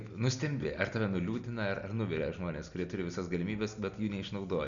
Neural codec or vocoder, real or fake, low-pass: none; real; 7.2 kHz